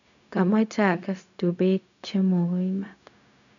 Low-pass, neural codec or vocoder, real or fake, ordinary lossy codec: 7.2 kHz; codec, 16 kHz, 0.4 kbps, LongCat-Audio-Codec; fake; none